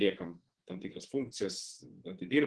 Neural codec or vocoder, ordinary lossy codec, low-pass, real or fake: vocoder, 22.05 kHz, 80 mel bands, WaveNeXt; Opus, 16 kbps; 9.9 kHz; fake